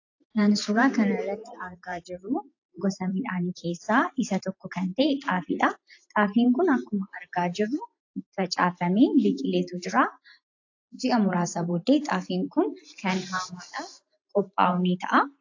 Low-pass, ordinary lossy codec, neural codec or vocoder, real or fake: 7.2 kHz; AAC, 48 kbps; vocoder, 44.1 kHz, 128 mel bands every 512 samples, BigVGAN v2; fake